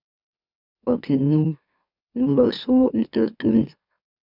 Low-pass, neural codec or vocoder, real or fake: 5.4 kHz; autoencoder, 44.1 kHz, a latent of 192 numbers a frame, MeloTTS; fake